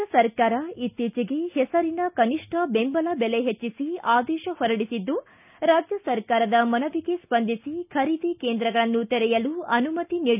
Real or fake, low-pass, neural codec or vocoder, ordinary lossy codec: real; 3.6 kHz; none; none